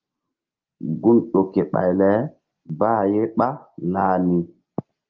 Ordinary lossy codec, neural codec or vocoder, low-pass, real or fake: Opus, 32 kbps; none; 7.2 kHz; real